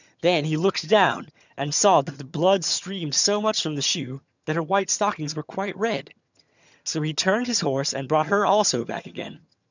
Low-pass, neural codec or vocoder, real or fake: 7.2 kHz; vocoder, 22.05 kHz, 80 mel bands, HiFi-GAN; fake